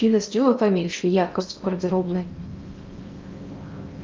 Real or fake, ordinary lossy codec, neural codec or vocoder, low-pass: fake; Opus, 24 kbps; codec, 16 kHz in and 24 kHz out, 0.6 kbps, FocalCodec, streaming, 2048 codes; 7.2 kHz